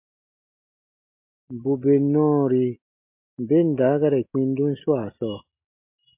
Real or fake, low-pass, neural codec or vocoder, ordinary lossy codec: real; 3.6 kHz; none; MP3, 32 kbps